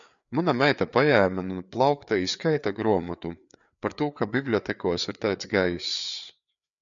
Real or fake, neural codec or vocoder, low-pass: fake; codec, 16 kHz, 4 kbps, FreqCodec, larger model; 7.2 kHz